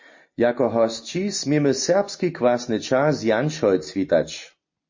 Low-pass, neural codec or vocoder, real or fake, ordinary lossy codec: 7.2 kHz; none; real; MP3, 32 kbps